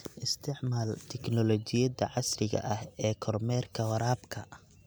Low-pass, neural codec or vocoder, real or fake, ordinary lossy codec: none; none; real; none